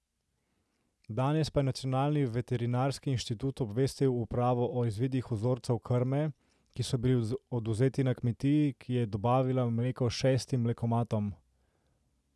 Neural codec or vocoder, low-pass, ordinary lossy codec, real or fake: none; none; none; real